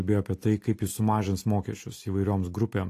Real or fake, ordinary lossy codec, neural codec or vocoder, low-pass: real; AAC, 48 kbps; none; 14.4 kHz